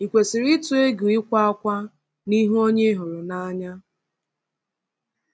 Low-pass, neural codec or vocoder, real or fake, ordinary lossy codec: none; none; real; none